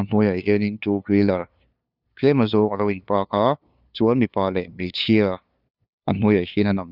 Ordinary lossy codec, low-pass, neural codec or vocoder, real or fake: none; 5.4 kHz; codec, 16 kHz, 2 kbps, FunCodec, trained on LibriTTS, 25 frames a second; fake